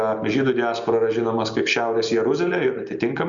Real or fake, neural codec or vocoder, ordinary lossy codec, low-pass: real; none; Opus, 64 kbps; 7.2 kHz